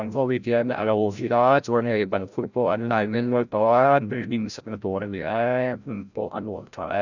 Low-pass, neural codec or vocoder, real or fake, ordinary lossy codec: 7.2 kHz; codec, 16 kHz, 0.5 kbps, FreqCodec, larger model; fake; none